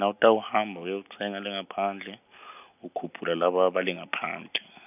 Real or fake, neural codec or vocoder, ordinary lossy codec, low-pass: real; none; none; 3.6 kHz